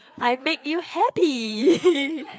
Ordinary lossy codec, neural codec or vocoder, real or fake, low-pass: none; codec, 16 kHz, 4 kbps, FreqCodec, larger model; fake; none